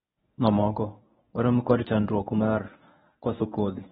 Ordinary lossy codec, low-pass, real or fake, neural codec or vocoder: AAC, 16 kbps; 10.8 kHz; fake; codec, 24 kHz, 0.9 kbps, WavTokenizer, medium speech release version 1